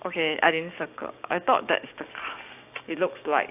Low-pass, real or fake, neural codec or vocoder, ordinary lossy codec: 3.6 kHz; fake; codec, 16 kHz, 6 kbps, DAC; AAC, 32 kbps